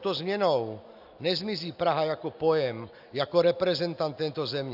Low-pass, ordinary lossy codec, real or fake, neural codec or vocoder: 5.4 kHz; AAC, 48 kbps; real; none